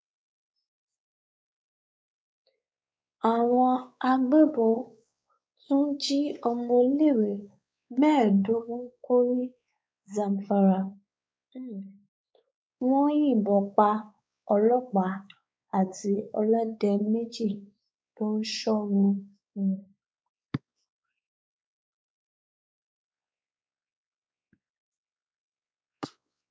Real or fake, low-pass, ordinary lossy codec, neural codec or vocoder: fake; none; none; codec, 16 kHz, 4 kbps, X-Codec, WavLM features, trained on Multilingual LibriSpeech